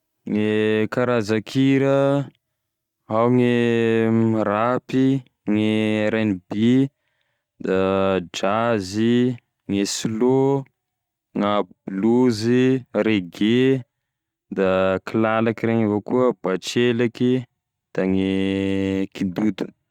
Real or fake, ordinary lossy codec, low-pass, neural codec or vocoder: real; Opus, 64 kbps; 19.8 kHz; none